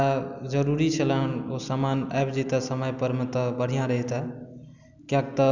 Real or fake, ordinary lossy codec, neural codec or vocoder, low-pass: real; none; none; 7.2 kHz